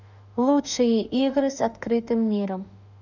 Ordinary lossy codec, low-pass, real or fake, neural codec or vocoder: none; 7.2 kHz; fake; autoencoder, 48 kHz, 32 numbers a frame, DAC-VAE, trained on Japanese speech